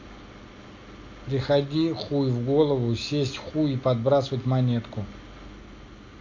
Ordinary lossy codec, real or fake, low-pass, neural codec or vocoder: MP3, 48 kbps; real; 7.2 kHz; none